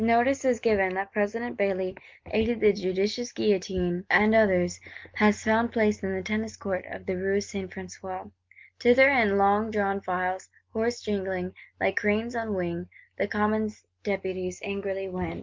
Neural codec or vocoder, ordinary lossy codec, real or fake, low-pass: none; Opus, 32 kbps; real; 7.2 kHz